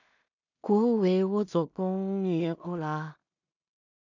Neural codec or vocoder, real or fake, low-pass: codec, 16 kHz in and 24 kHz out, 0.4 kbps, LongCat-Audio-Codec, two codebook decoder; fake; 7.2 kHz